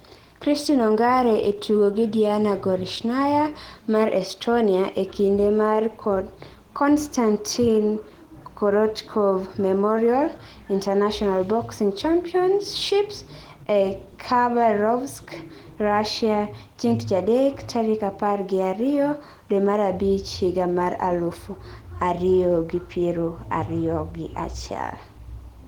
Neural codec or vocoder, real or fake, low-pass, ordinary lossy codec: none; real; 19.8 kHz; Opus, 16 kbps